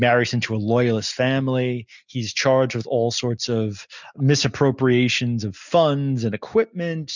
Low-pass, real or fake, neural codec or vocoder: 7.2 kHz; real; none